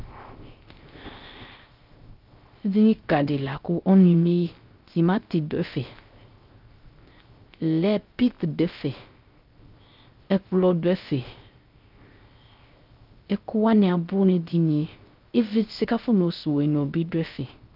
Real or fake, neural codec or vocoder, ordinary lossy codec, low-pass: fake; codec, 16 kHz, 0.3 kbps, FocalCodec; Opus, 24 kbps; 5.4 kHz